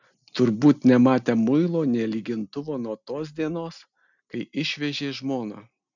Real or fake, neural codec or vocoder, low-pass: real; none; 7.2 kHz